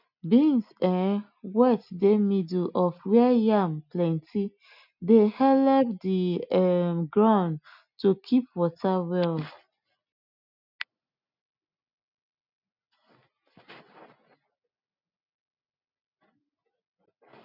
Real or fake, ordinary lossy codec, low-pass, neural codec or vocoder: real; none; 5.4 kHz; none